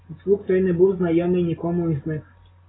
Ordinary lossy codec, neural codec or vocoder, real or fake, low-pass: AAC, 16 kbps; none; real; 7.2 kHz